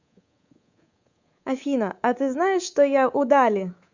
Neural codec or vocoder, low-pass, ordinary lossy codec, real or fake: codec, 24 kHz, 3.1 kbps, DualCodec; 7.2 kHz; Opus, 64 kbps; fake